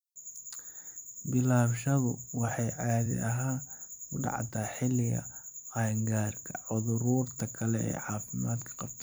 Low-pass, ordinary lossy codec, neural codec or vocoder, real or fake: none; none; vocoder, 44.1 kHz, 128 mel bands every 256 samples, BigVGAN v2; fake